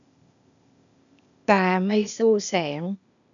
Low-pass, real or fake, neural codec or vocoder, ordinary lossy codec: 7.2 kHz; fake; codec, 16 kHz, 0.8 kbps, ZipCodec; none